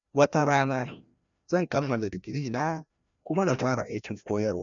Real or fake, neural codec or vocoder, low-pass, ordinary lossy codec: fake; codec, 16 kHz, 1 kbps, FreqCodec, larger model; 7.2 kHz; none